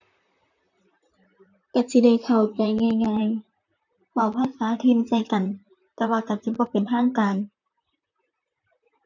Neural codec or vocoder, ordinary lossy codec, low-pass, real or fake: vocoder, 44.1 kHz, 128 mel bands, Pupu-Vocoder; none; 7.2 kHz; fake